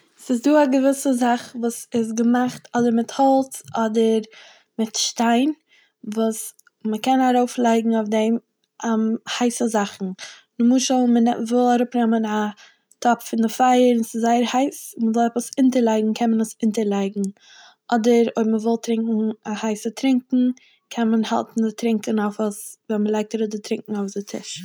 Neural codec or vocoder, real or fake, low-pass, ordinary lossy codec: none; real; none; none